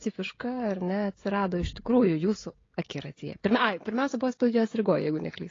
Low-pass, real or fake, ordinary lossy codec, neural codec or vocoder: 7.2 kHz; fake; AAC, 32 kbps; codec, 16 kHz, 16 kbps, FreqCodec, smaller model